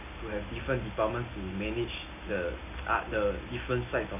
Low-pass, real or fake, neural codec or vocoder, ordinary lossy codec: 3.6 kHz; real; none; none